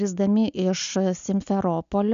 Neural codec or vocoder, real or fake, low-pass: none; real; 7.2 kHz